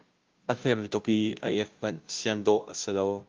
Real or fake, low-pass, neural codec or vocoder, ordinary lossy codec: fake; 7.2 kHz; codec, 16 kHz, 0.5 kbps, FunCodec, trained on Chinese and English, 25 frames a second; Opus, 32 kbps